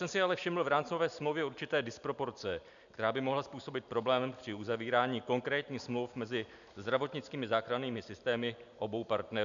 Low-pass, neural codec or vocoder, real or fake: 7.2 kHz; none; real